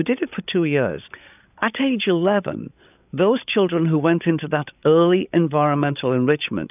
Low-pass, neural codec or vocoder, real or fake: 3.6 kHz; codec, 16 kHz, 8 kbps, FreqCodec, larger model; fake